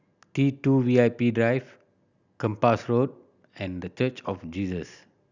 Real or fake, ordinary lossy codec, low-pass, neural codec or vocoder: real; none; 7.2 kHz; none